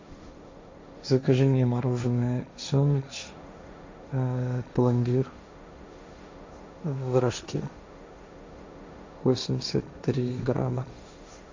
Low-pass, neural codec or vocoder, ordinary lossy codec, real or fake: 7.2 kHz; codec, 16 kHz, 1.1 kbps, Voila-Tokenizer; MP3, 64 kbps; fake